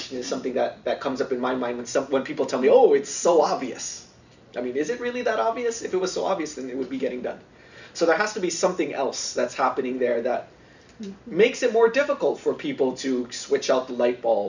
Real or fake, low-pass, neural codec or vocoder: real; 7.2 kHz; none